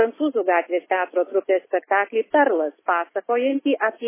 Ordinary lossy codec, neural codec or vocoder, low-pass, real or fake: MP3, 16 kbps; none; 3.6 kHz; real